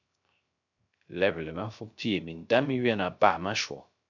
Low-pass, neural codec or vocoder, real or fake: 7.2 kHz; codec, 16 kHz, 0.3 kbps, FocalCodec; fake